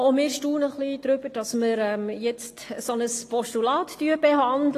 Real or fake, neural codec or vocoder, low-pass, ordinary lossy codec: real; none; 14.4 kHz; AAC, 48 kbps